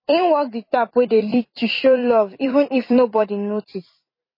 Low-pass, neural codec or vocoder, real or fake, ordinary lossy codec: 5.4 kHz; vocoder, 44.1 kHz, 128 mel bands every 256 samples, BigVGAN v2; fake; MP3, 24 kbps